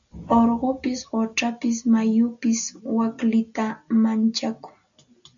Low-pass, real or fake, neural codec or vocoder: 7.2 kHz; real; none